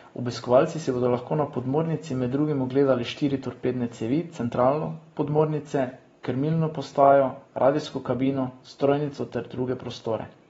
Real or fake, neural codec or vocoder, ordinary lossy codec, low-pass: real; none; AAC, 24 kbps; 19.8 kHz